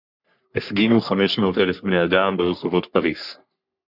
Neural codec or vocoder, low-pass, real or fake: codec, 44.1 kHz, 3.4 kbps, Pupu-Codec; 5.4 kHz; fake